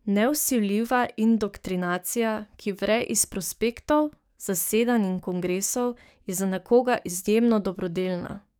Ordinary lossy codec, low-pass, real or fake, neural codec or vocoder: none; none; fake; codec, 44.1 kHz, 7.8 kbps, DAC